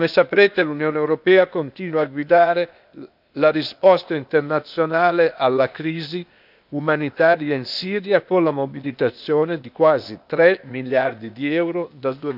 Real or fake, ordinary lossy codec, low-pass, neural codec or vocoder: fake; none; 5.4 kHz; codec, 16 kHz, 0.8 kbps, ZipCodec